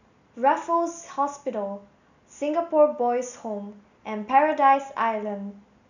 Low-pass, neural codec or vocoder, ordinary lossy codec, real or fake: 7.2 kHz; none; none; real